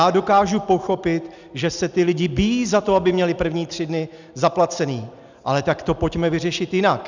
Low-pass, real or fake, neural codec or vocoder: 7.2 kHz; real; none